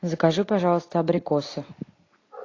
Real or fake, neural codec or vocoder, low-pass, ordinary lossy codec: real; none; 7.2 kHz; AAC, 32 kbps